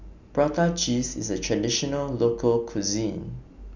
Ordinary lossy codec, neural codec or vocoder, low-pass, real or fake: none; none; 7.2 kHz; real